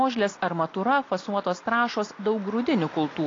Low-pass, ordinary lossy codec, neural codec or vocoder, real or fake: 7.2 kHz; AAC, 32 kbps; none; real